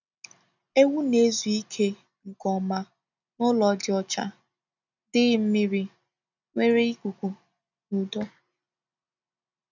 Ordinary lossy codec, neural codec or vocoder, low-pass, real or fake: none; none; 7.2 kHz; real